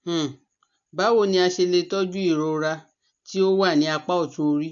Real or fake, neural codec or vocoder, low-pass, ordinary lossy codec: real; none; 7.2 kHz; AAC, 64 kbps